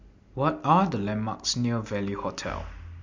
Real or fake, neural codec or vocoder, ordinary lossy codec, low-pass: real; none; MP3, 48 kbps; 7.2 kHz